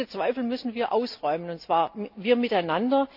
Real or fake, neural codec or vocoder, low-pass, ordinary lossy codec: real; none; 5.4 kHz; none